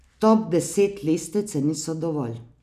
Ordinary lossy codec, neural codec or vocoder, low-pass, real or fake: none; none; 14.4 kHz; real